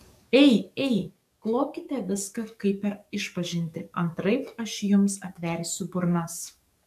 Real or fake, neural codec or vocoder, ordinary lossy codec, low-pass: fake; codec, 44.1 kHz, 7.8 kbps, DAC; AAC, 96 kbps; 14.4 kHz